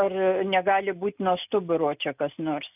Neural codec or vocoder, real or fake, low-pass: none; real; 3.6 kHz